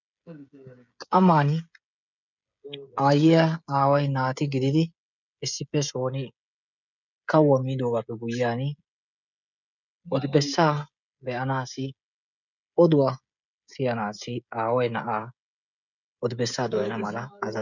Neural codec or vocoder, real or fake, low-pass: codec, 16 kHz, 16 kbps, FreqCodec, smaller model; fake; 7.2 kHz